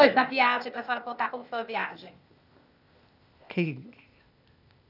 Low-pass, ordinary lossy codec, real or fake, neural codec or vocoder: 5.4 kHz; none; fake; codec, 16 kHz, 0.8 kbps, ZipCodec